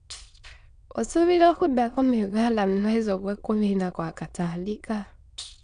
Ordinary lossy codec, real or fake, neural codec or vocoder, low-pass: none; fake; autoencoder, 22.05 kHz, a latent of 192 numbers a frame, VITS, trained on many speakers; 9.9 kHz